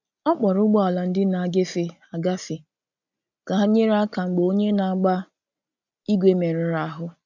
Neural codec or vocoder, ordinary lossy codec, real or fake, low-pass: none; none; real; 7.2 kHz